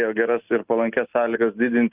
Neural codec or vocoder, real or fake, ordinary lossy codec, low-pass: none; real; Opus, 24 kbps; 3.6 kHz